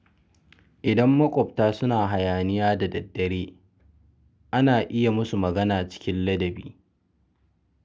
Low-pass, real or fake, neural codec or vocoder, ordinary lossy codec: none; real; none; none